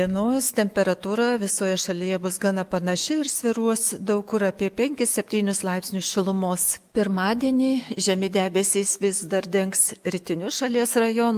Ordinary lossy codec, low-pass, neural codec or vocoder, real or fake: Opus, 32 kbps; 14.4 kHz; autoencoder, 48 kHz, 128 numbers a frame, DAC-VAE, trained on Japanese speech; fake